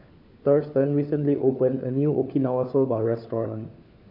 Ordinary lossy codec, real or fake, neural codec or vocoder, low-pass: AAC, 48 kbps; fake; codec, 16 kHz, 4 kbps, FunCodec, trained on LibriTTS, 50 frames a second; 5.4 kHz